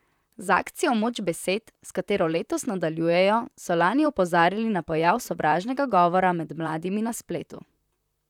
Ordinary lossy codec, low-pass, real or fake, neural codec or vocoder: none; 19.8 kHz; fake; vocoder, 44.1 kHz, 128 mel bands, Pupu-Vocoder